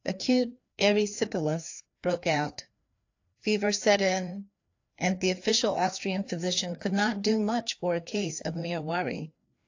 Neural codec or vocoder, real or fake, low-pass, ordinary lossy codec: codec, 16 kHz, 2 kbps, FreqCodec, larger model; fake; 7.2 kHz; AAC, 48 kbps